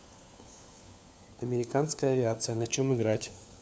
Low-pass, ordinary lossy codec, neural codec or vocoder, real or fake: none; none; codec, 16 kHz, 4 kbps, FunCodec, trained on LibriTTS, 50 frames a second; fake